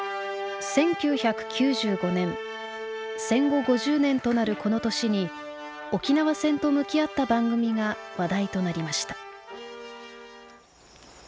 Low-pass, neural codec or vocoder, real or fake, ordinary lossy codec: none; none; real; none